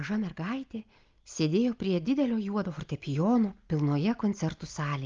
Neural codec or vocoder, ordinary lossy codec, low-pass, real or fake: none; Opus, 24 kbps; 7.2 kHz; real